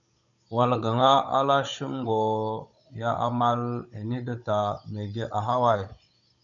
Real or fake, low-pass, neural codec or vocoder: fake; 7.2 kHz; codec, 16 kHz, 16 kbps, FunCodec, trained on Chinese and English, 50 frames a second